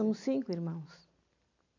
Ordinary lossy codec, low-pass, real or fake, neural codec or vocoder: none; 7.2 kHz; real; none